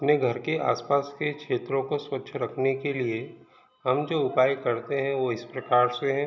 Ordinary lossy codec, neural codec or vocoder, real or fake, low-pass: none; none; real; 7.2 kHz